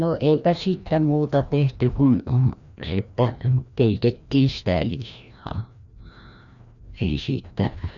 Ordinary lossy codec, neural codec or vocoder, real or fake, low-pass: none; codec, 16 kHz, 1 kbps, FreqCodec, larger model; fake; 7.2 kHz